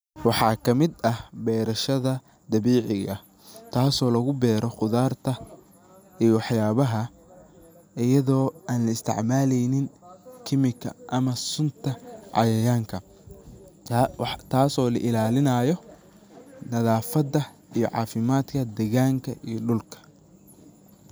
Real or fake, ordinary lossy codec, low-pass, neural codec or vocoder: real; none; none; none